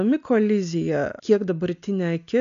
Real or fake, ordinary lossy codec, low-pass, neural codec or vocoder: real; AAC, 96 kbps; 7.2 kHz; none